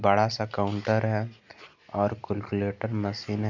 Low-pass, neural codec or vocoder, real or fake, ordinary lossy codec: 7.2 kHz; none; real; none